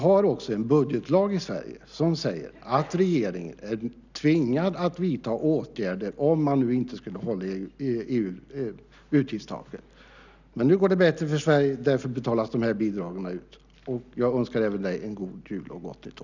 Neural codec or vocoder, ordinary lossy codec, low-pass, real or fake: none; none; 7.2 kHz; real